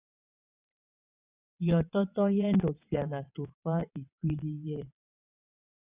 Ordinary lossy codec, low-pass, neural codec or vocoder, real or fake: Opus, 64 kbps; 3.6 kHz; vocoder, 22.05 kHz, 80 mel bands, WaveNeXt; fake